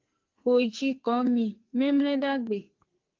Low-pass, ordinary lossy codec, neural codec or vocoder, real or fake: 7.2 kHz; Opus, 32 kbps; codec, 44.1 kHz, 2.6 kbps, SNAC; fake